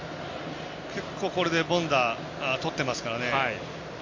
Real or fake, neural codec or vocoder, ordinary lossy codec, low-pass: real; none; MP3, 48 kbps; 7.2 kHz